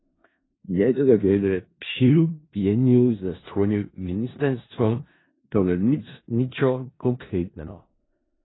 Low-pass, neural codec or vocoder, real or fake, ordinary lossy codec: 7.2 kHz; codec, 16 kHz in and 24 kHz out, 0.4 kbps, LongCat-Audio-Codec, four codebook decoder; fake; AAC, 16 kbps